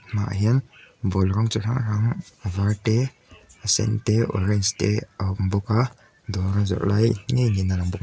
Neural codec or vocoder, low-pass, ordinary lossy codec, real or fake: none; none; none; real